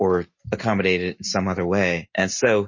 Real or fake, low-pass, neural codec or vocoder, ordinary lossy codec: real; 7.2 kHz; none; MP3, 32 kbps